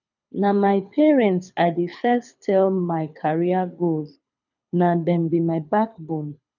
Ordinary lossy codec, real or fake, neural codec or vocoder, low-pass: none; fake; codec, 24 kHz, 6 kbps, HILCodec; 7.2 kHz